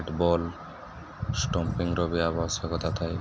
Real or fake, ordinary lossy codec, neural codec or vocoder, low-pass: real; none; none; none